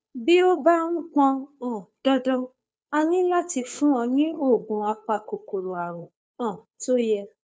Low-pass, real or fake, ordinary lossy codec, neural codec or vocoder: none; fake; none; codec, 16 kHz, 2 kbps, FunCodec, trained on Chinese and English, 25 frames a second